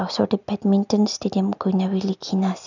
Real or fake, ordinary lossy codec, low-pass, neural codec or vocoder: real; none; 7.2 kHz; none